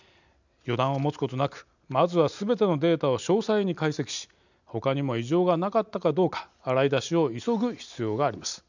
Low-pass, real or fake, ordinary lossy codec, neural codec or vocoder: 7.2 kHz; real; none; none